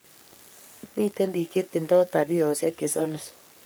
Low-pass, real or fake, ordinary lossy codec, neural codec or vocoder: none; fake; none; codec, 44.1 kHz, 3.4 kbps, Pupu-Codec